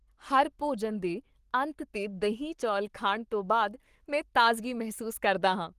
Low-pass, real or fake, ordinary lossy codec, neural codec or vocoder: 14.4 kHz; fake; Opus, 24 kbps; codec, 44.1 kHz, 7.8 kbps, Pupu-Codec